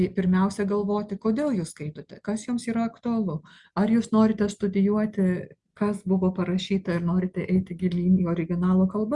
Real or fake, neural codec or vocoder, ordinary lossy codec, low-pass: real; none; Opus, 64 kbps; 10.8 kHz